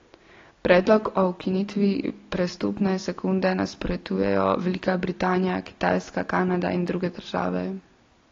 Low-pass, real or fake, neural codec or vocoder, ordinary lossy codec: 7.2 kHz; real; none; AAC, 32 kbps